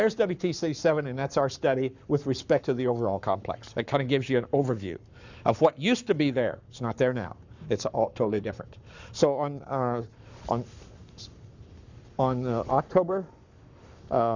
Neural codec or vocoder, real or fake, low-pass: codec, 44.1 kHz, 7.8 kbps, Pupu-Codec; fake; 7.2 kHz